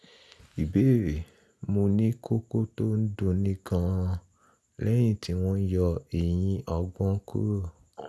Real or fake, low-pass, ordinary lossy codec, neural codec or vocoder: real; none; none; none